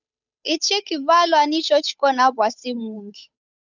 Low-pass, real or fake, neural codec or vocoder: 7.2 kHz; fake; codec, 16 kHz, 8 kbps, FunCodec, trained on Chinese and English, 25 frames a second